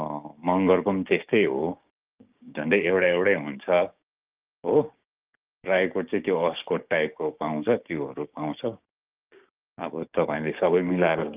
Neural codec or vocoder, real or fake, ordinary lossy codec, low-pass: codec, 16 kHz, 6 kbps, DAC; fake; Opus, 24 kbps; 3.6 kHz